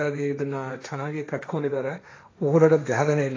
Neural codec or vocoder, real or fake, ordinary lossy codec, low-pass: codec, 16 kHz, 1.1 kbps, Voila-Tokenizer; fake; AAC, 32 kbps; 7.2 kHz